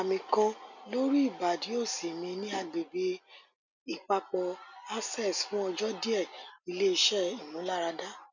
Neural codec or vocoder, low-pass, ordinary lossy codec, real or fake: none; 7.2 kHz; none; real